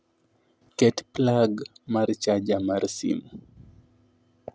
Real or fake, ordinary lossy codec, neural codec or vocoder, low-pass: real; none; none; none